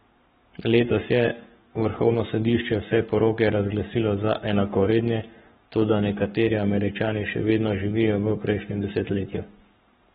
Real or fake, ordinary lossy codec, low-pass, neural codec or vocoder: fake; AAC, 16 kbps; 19.8 kHz; codec, 44.1 kHz, 7.8 kbps, Pupu-Codec